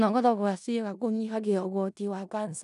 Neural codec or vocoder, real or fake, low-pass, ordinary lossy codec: codec, 16 kHz in and 24 kHz out, 0.4 kbps, LongCat-Audio-Codec, four codebook decoder; fake; 10.8 kHz; none